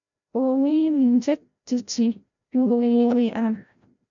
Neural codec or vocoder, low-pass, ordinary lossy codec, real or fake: codec, 16 kHz, 0.5 kbps, FreqCodec, larger model; 7.2 kHz; MP3, 96 kbps; fake